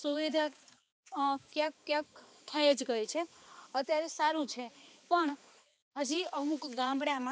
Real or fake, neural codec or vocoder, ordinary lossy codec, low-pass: fake; codec, 16 kHz, 2 kbps, X-Codec, HuBERT features, trained on balanced general audio; none; none